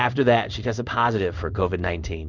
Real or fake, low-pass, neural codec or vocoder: fake; 7.2 kHz; codec, 16 kHz in and 24 kHz out, 1 kbps, XY-Tokenizer